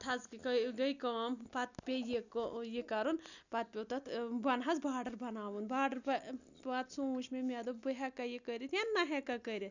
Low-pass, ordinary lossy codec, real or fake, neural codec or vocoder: 7.2 kHz; none; real; none